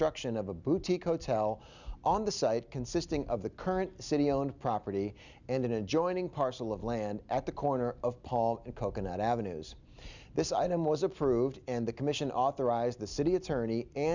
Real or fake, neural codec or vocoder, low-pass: real; none; 7.2 kHz